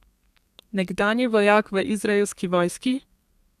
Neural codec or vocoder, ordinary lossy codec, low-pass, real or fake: codec, 32 kHz, 1.9 kbps, SNAC; none; 14.4 kHz; fake